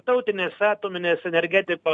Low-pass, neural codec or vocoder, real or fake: 9.9 kHz; none; real